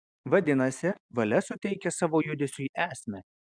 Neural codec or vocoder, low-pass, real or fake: none; 9.9 kHz; real